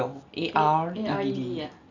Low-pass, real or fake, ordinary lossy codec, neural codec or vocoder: 7.2 kHz; real; none; none